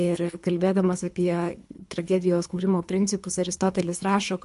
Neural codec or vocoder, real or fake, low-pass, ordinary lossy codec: codec, 24 kHz, 3 kbps, HILCodec; fake; 10.8 kHz; MP3, 64 kbps